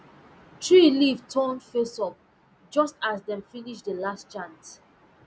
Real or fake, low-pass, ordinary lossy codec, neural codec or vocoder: real; none; none; none